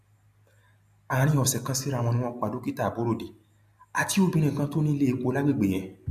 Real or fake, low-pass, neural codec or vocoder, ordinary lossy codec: real; 14.4 kHz; none; MP3, 96 kbps